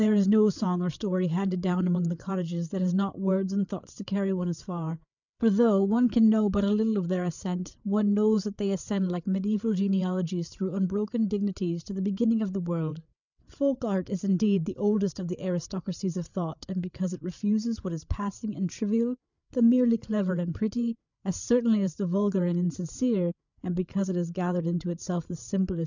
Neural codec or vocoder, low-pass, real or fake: codec, 16 kHz, 8 kbps, FreqCodec, larger model; 7.2 kHz; fake